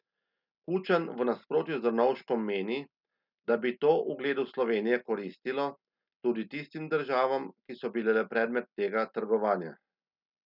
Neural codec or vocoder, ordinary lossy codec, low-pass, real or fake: none; none; 5.4 kHz; real